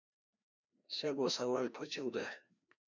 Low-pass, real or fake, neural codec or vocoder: 7.2 kHz; fake; codec, 16 kHz, 1 kbps, FreqCodec, larger model